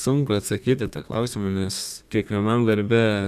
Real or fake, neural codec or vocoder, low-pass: fake; codec, 32 kHz, 1.9 kbps, SNAC; 14.4 kHz